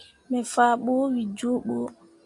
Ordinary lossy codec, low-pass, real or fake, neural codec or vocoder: MP3, 48 kbps; 10.8 kHz; real; none